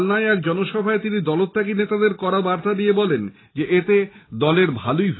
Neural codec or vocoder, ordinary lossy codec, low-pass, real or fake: none; AAC, 16 kbps; 7.2 kHz; real